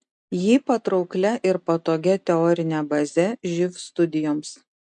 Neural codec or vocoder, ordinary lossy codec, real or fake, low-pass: none; MP3, 64 kbps; real; 10.8 kHz